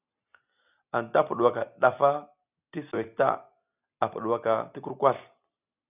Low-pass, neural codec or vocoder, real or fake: 3.6 kHz; none; real